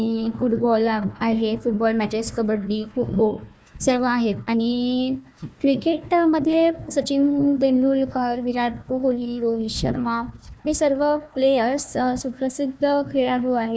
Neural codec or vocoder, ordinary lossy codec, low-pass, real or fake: codec, 16 kHz, 1 kbps, FunCodec, trained on Chinese and English, 50 frames a second; none; none; fake